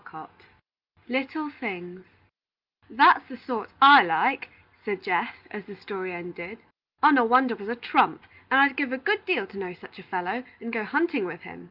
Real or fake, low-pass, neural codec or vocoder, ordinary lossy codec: real; 5.4 kHz; none; Opus, 32 kbps